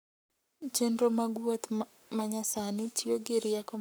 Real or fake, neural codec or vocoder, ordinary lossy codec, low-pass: fake; codec, 44.1 kHz, 7.8 kbps, Pupu-Codec; none; none